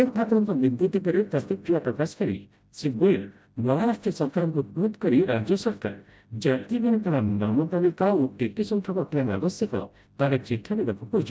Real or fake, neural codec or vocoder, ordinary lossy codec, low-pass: fake; codec, 16 kHz, 0.5 kbps, FreqCodec, smaller model; none; none